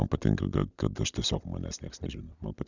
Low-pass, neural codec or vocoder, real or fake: 7.2 kHz; none; real